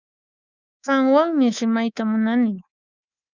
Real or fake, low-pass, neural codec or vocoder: fake; 7.2 kHz; codec, 24 kHz, 3.1 kbps, DualCodec